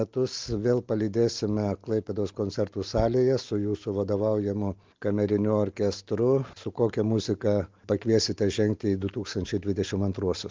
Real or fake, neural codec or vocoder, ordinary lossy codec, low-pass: real; none; Opus, 32 kbps; 7.2 kHz